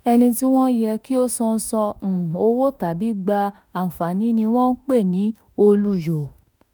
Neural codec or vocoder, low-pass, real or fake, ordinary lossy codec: autoencoder, 48 kHz, 32 numbers a frame, DAC-VAE, trained on Japanese speech; none; fake; none